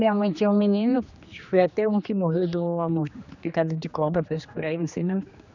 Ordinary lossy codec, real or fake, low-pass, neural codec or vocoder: none; fake; 7.2 kHz; codec, 16 kHz, 2 kbps, X-Codec, HuBERT features, trained on general audio